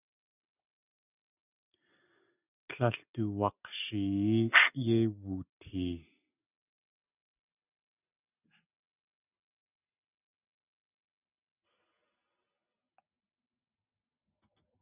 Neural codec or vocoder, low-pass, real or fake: none; 3.6 kHz; real